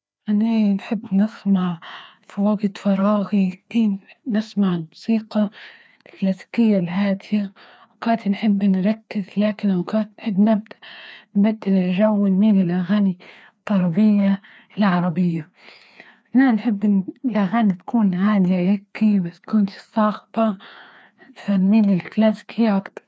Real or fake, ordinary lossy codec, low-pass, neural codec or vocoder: fake; none; none; codec, 16 kHz, 2 kbps, FreqCodec, larger model